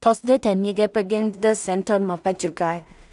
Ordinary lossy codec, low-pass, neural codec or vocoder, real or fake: none; 10.8 kHz; codec, 16 kHz in and 24 kHz out, 0.4 kbps, LongCat-Audio-Codec, two codebook decoder; fake